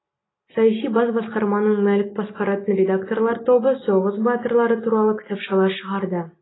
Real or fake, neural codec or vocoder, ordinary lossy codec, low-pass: real; none; AAC, 16 kbps; 7.2 kHz